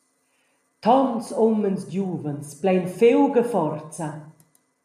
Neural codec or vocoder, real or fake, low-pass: none; real; 14.4 kHz